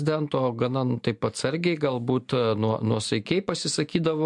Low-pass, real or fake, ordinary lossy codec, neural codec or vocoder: 10.8 kHz; real; MP3, 64 kbps; none